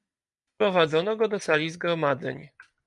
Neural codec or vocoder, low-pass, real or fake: none; 10.8 kHz; real